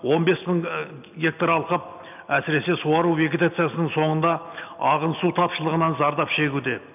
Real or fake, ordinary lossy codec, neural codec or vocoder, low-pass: real; none; none; 3.6 kHz